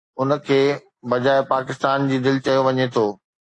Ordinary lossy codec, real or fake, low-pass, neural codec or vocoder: AAC, 48 kbps; real; 10.8 kHz; none